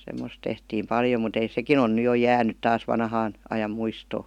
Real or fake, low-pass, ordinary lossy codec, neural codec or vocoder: real; 19.8 kHz; none; none